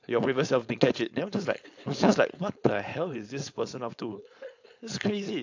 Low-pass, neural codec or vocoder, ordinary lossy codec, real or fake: 7.2 kHz; codec, 16 kHz, 4.8 kbps, FACodec; AAC, 48 kbps; fake